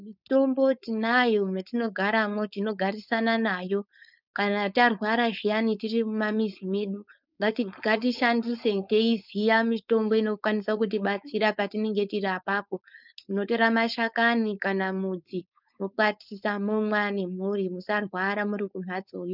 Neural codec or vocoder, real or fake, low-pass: codec, 16 kHz, 4.8 kbps, FACodec; fake; 5.4 kHz